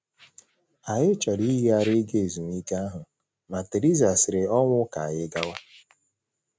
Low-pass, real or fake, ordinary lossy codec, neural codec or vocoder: none; real; none; none